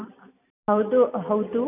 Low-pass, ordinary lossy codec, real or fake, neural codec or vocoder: 3.6 kHz; none; real; none